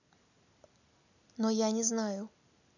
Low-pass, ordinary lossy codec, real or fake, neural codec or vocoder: 7.2 kHz; none; real; none